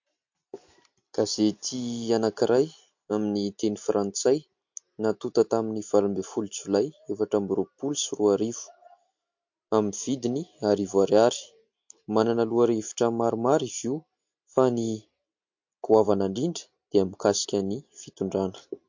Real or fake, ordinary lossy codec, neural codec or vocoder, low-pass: real; MP3, 48 kbps; none; 7.2 kHz